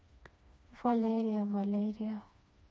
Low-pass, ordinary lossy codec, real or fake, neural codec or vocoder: none; none; fake; codec, 16 kHz, 2 kbps, FreqCodec, smaller model